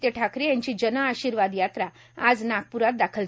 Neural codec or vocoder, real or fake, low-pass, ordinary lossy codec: none; real; none; none